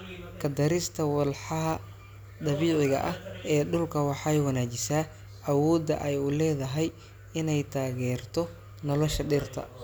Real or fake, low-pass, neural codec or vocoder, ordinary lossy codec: real; none; none; none